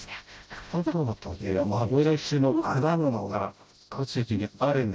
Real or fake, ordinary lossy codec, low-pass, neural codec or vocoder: fake; none; none; codec, 16 kHz, 0.5 kbps, FreqCodec, smaller model